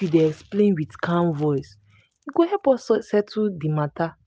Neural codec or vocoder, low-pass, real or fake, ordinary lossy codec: none; none; real; none